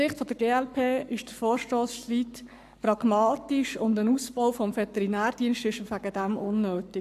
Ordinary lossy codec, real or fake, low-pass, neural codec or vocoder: none; fake; 14.4 kHz; codec, 44.1 kHz, 7.8 kbps, Pupu-Codec